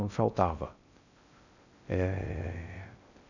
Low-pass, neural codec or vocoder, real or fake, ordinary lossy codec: 7.2 kHz; codec, 16 kHz in and 24 kHz out, 0.6 kbps, FocalCodec, streaming, 2048 codes; fake; none